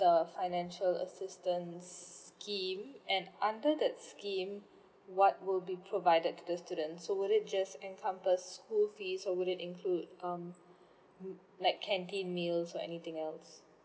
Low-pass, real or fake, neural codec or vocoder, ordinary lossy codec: none; real; none; none